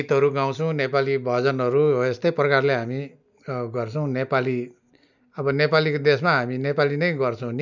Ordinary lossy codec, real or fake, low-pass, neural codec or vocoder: none; real; 7.2 kHz; none